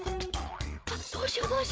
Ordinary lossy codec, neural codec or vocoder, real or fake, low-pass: none; codec, 16 kHz, 8 kbps, FreqCodec, larger model; fake; none